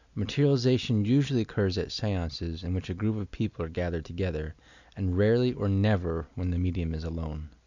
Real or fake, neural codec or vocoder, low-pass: real; none; 7.2 kHz